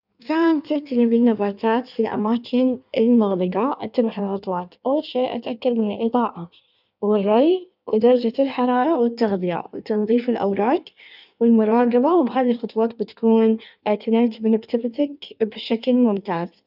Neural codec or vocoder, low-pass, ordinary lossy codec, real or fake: codec, 16 kHz in and 24 kHz out, 1.1 kbps, FireRedTTS-2 codec; 5.4 kHz; none; fake